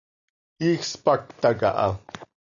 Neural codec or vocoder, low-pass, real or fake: none; 7.2 kHz; real